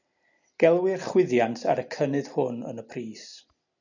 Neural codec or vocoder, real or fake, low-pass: none; real; 7.2 kHz